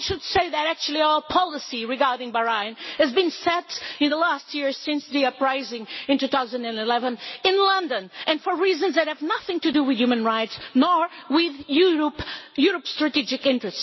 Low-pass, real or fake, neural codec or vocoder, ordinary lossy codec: 7.2 kHz; real; none; MP3, 24 kbps